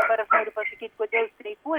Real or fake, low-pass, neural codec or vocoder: real; 19.8 kHz; none